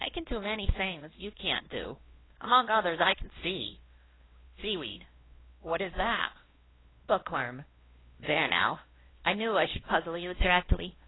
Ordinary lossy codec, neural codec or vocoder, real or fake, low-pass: AAC, 16 kbps; codec, 16 kHz, 1 kbps, X-Codec, WavLM features, trained on Multilingual LibriSpeech; fake; 7.2 kHz